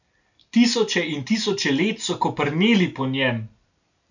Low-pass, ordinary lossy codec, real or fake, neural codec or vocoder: 7.2 kHz; none; real; none